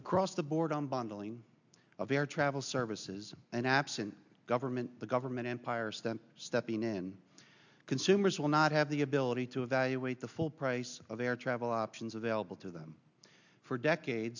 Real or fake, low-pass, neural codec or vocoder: real; 7.2 kHz; none